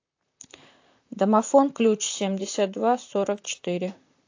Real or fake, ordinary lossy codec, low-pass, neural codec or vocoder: fake; AAC, 48 kbps; 7.2 kHz; vocoder, 44.1 kHz, 128 mel bands, Pupu-Vocoder